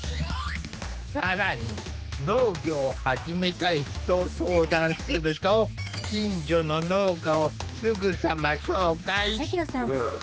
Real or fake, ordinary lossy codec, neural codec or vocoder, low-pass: fake; none; codec, 16 kHz, 2 kbps, X-Codec, HuBERT features, trained on general audio; none